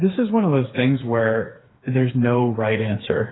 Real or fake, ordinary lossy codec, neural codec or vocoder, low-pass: fake; AAC, 16 kbps; codec, 16 kHz, 4 kbps, FreqCodec, smaller model; 7.2 kHz